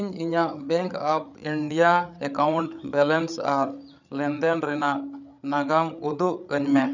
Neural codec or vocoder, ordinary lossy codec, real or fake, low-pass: codec, 16 kHz, 8 kbps, FreqCodec, larger model; none; fake; 7.2 kHz